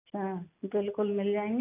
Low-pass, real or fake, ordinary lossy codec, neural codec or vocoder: 3.6 kHz; real; none; none